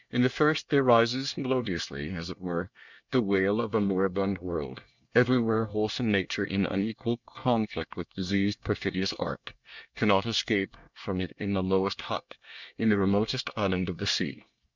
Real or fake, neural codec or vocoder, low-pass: fake; codec, 24 kHz, 1 kbps, SNAC; 7.2 kHz